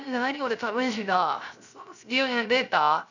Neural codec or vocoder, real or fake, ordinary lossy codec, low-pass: codec, 16 kHz, 0.3 kbps, FocalCodec; fake; none; 7.2 kHz